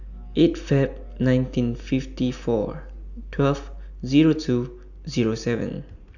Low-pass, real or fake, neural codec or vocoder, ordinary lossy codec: 7.2 kHz; real; none; none